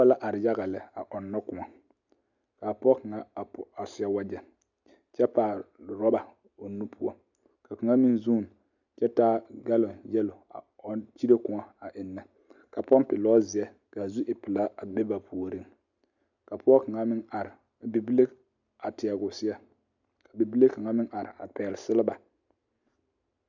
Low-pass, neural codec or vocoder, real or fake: 7.2 kHz; none; real